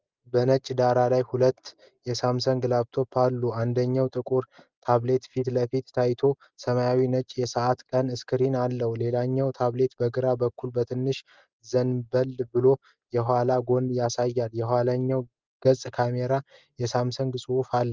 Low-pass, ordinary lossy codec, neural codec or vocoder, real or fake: 7.2 kHz; Opus, 32 kbps; none; real